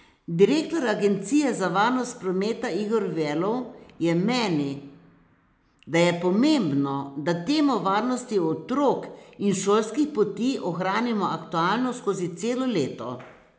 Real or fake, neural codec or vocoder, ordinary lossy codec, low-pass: real; none; none; none